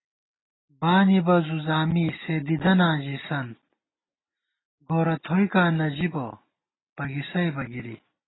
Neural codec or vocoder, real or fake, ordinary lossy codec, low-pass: none; real; AAC, 16 kbps; 7.2 kHz